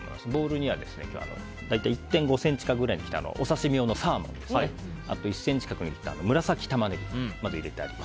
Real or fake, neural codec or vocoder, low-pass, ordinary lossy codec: real; none; none; none